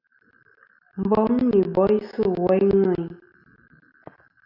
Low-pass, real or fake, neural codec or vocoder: 5.4 kHz; real; none